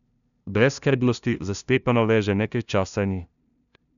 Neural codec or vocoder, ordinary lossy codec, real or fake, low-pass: codec, 16 kHz, 0.5 kbps, FunCodec, trained on LibriTTS, 25 frames a second; none; fake; 7.2 kHz